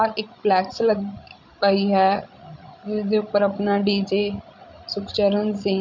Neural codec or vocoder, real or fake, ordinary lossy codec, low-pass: codec, 16 kHz, 16 kbps, FreqCodec, larger model; fake; MP3, 64 kbps; 7.2 kHz